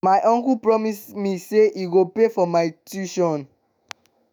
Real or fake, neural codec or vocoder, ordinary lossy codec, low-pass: fake; autoencoder, 48 kHz, 128 numbers a frame, DAC-VAE, trained on Japanese speech; none; none